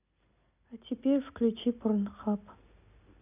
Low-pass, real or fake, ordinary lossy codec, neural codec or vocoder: 3.6 kHz; real; none; none